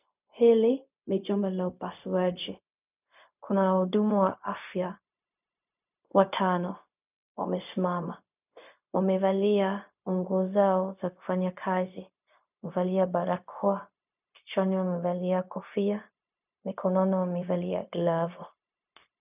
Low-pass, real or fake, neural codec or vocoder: 3.6 kHz; fake; codec, 16 kHz, 0.4 kbps, LongCat-Audio-Codec